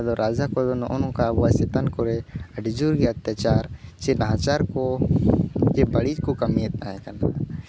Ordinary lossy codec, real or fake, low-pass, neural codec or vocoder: none; real; none; none